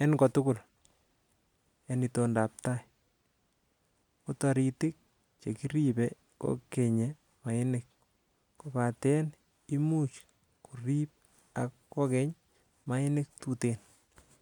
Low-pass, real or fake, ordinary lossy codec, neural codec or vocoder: 19.8 kHz; real; none; none